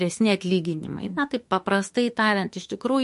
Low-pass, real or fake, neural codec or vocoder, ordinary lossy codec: 14.4 kHz; fake; autoencoder, 48 kHz, 32 numbers a frame, DAC-VAE, trained on Japanese speech; MP3, 48 kbps